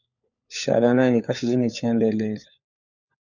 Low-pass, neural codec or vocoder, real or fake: 7.2 kHz; codec, 16 kHz, 4 kbps, FunCodec, trained on LibriTTS, 50 frames a second; fake